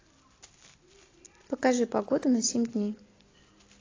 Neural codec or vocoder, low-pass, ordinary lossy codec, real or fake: none; 7.2 kHz; AAC, 32 kbps; real